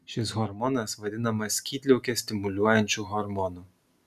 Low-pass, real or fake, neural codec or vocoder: 14.4 kHz; real; none